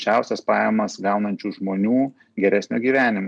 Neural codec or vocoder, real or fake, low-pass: none; real; 9.9 kHz